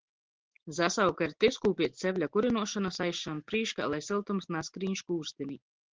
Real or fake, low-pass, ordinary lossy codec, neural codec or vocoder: real; 7.2 kHz; Opus, 16 kbps; none